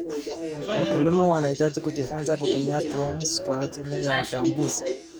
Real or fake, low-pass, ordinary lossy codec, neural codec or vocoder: fake; none; none; codec, 44.1 kHz, 2.6 kbps, DAC